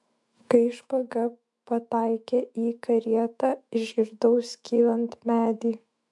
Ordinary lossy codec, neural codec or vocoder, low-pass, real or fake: MP3, 64 kbps; autoencoder, 48 kHz, 128 numbers a frame, DAC-VAE, trained on Japanese speech; 10.8 kHz; fake